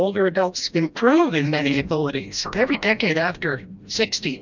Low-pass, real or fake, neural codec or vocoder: 7.2 kHz; fake; codec, 16 kHz, 1 kbps, FreqCodec, smaller model